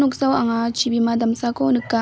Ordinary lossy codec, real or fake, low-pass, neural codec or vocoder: none; real; none; none